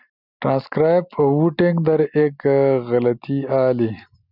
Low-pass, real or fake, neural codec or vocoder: 5.4 kHz; real; none